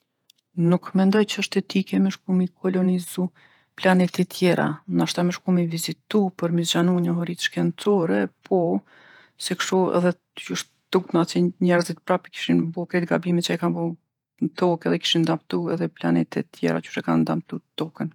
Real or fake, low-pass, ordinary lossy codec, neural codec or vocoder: fake; 19.8 kHz; none; vocoder, 48 kHz, 128 mel bands, Vocos